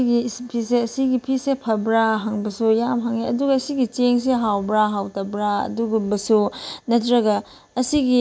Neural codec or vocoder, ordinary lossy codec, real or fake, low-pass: none; none; real; none